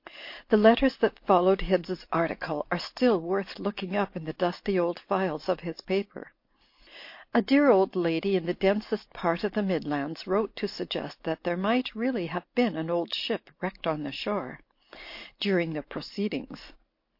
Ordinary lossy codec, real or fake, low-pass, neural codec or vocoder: MP3, 32 kbps; real; 5.4 kHz; none